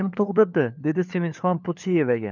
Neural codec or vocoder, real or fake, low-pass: codec, 16 kHz, 4 kbps, FunCodec, trained on LibriTTS, 50 frames a second; fake; 7.2 kHz